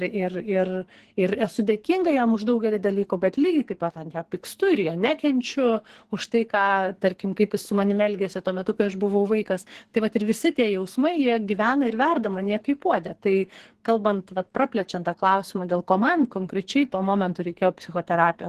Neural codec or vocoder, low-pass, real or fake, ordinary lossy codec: codec, 44.1 kHz, 2.6 kbps, SNAC; 14.4 kHz; fake; Opus, 16 kbps